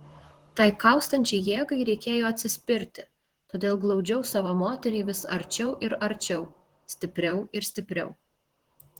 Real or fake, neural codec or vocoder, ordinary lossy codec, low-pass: fake; vocoder, 44.1 kHz, 128 mel bands, Pupu-Vocoder; Opus, 16 kbps; 19.8 kHz